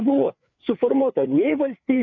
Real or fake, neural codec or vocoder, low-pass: fake; codec, 16 kHz, 4 kbps, FreqCodec, larger model; 7.2 kHz